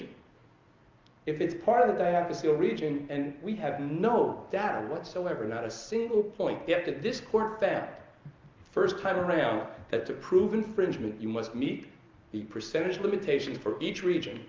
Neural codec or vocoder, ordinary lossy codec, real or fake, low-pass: none; Opus, 32 kbps; real; 7.2 kHz